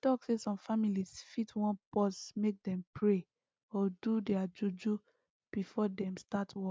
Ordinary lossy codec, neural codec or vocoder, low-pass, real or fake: none; none; none; real